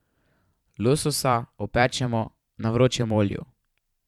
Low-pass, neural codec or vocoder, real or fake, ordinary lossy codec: 19.8 kHz; vocoder, 44.1 kHz, 128 mel bands every 512 samples, BigVGAN v2; fake; none